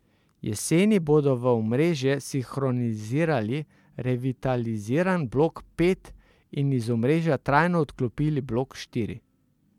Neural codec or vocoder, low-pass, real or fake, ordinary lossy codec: none; 19.8 kHz; real; MP3, 96 kbps